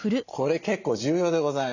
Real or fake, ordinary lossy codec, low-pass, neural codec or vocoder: real; none; 7.2 kHz; none